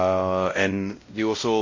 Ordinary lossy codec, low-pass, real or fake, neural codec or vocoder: MP3, 32 kbps; 7.2 kHz; fake; codec, 16 kHz, 0.5 kbps, X-Codec, WavLM features, trained on Multilingual LibriSpeech